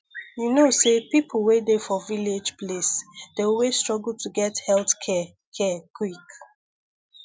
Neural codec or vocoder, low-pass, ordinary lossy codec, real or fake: none; none; none; real